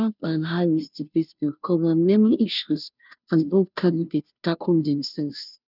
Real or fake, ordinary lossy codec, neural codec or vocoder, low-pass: fake; none; codec, 16 kHz, 0.5 kbps, FunCodec, trained on Chinese and English, 25 frames a second; 5.4 kHz